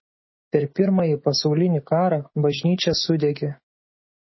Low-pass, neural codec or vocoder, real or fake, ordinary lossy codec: 7.2 kHz; autoencoder, 48 kHz, 128 numbers a frame, DAC-VAE, trained on Japanese speech; fake; MP3, 24 kbps